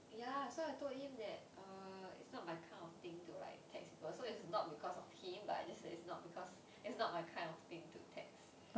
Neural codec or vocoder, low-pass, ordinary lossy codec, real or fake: none; none; none; real